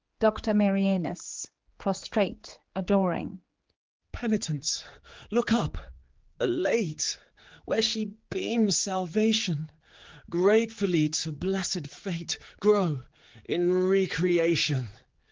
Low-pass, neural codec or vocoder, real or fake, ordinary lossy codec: 7.2 kHz; codec, 16 kHz, 4 kbps, X-Codec, HuBERT features, trained on general audio; fake; Opus, 16 kbps